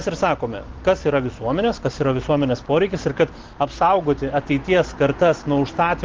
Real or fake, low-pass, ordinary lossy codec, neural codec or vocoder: real; 7.2 kHz; Opus, 16 kbps; none